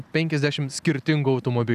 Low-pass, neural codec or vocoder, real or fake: 14.4 kHz; none; real